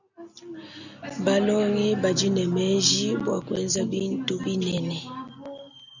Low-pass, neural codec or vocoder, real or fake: 7.2 kHz; none; real